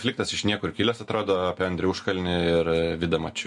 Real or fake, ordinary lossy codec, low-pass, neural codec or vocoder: real; MP3, 48 kbps; 10.8 kHz; none